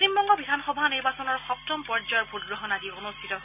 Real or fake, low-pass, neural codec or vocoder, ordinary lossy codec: real; 3.6 kHz; none; none